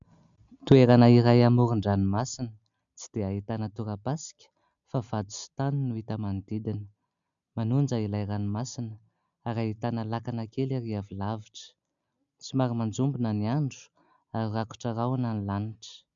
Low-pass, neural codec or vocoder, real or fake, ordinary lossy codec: 7.2 kHz; none; real; MP3, 96 kbps